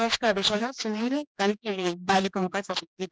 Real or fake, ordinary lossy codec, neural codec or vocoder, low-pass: fake; none; codec, 16 kHz, 2 kbps, X-Codec, HuBERT features, trained on general audio; none